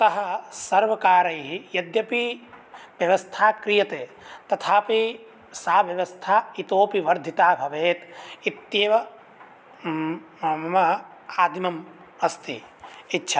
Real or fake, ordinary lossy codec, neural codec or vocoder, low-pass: real; none; none; none